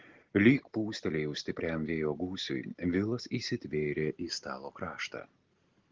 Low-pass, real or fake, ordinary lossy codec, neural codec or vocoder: 7.2 kHz; real; Opus, 16 kbps; none